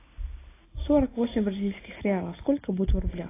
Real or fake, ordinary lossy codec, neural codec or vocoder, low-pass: real; AAC, 16 kbps; none; 3.6 kHz